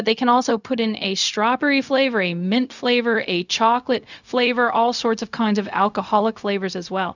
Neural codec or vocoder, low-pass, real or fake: codec, 16 kHz, 0.4 kbps, LongCat-Audio-Codec; 7.2 kHz; fake